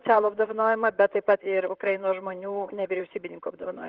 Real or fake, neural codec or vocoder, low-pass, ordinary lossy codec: fake; vocoder, 44.1 kHz, 128 mel bands, Pupu-Vocoder; 5.4 kHz; Opus, 16 kbps